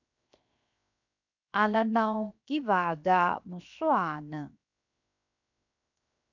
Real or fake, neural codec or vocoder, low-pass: fake; codec, 16 kHz, 0.7 kbps, FocalCodec; 7.2 kHz